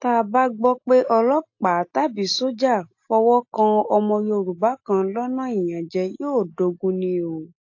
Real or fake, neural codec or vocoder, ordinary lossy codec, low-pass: real; none; AAC, 48 kbps; 7.2 kHz